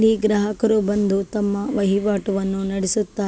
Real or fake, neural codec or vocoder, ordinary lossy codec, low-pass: real; none; none; none